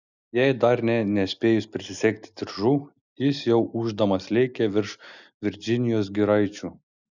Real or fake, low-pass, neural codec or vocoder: real; 7.2 kHz; none